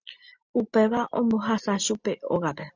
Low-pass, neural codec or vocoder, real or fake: 7.2 kHz; none; real